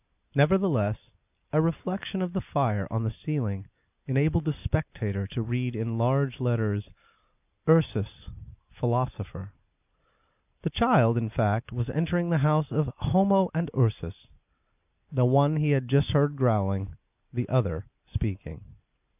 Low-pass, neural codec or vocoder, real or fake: 3.6 kHz; none; real